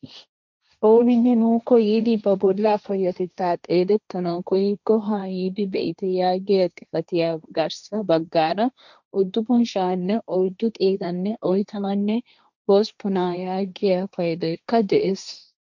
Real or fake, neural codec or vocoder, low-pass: fake; codec, 16 kHz, 1.1 kbps, Voila-Tokenizer; 7.2 kHz